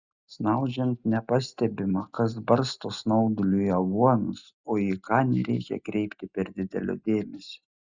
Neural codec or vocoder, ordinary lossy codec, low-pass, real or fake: none; Opus, 64 kbps; 7.2 kHz; real